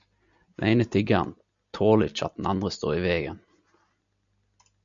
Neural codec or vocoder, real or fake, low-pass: none; real; 7.2 kHz